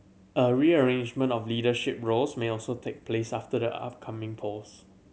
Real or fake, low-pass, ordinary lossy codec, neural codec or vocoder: real; none; none; none